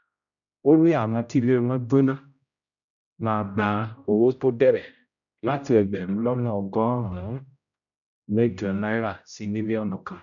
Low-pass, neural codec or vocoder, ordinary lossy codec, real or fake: 7.2 kHz; codec, 16 kHz, 0.5 kbps, X-Codec, HuBERT features, trained on general audio; none; fake